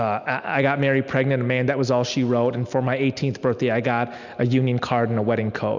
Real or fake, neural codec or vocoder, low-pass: real; none; 7.2 kHz